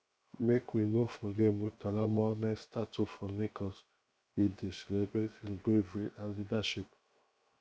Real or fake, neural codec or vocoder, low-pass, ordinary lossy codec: fake; codec, 16 kHz, 0.7 kbps, FocalCodec; none; none